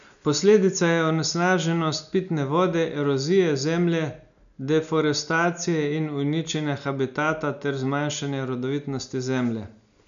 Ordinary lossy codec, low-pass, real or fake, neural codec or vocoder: none; 7.2 kHz; real; none